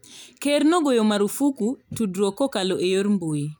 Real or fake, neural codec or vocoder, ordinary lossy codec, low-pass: real; none; none; none